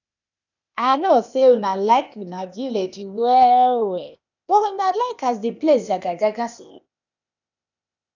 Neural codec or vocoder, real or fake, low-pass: codec, 16 kHz, 0.8 kbps, ZipCodec; fake; 7.2 kHz